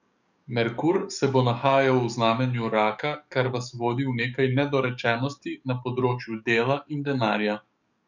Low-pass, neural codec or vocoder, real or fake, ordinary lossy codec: 7.2 kHz; codec, 44.1 kHz, 7.8 kbps, DAC; fake; none